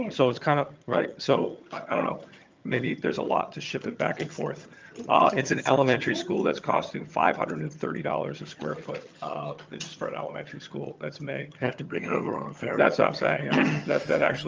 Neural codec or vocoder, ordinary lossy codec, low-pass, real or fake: vocoder, 22.05 kHz, 80 mel bands, HiFi-GAN; Opus, 24 kbps; 7.2 kHz; fake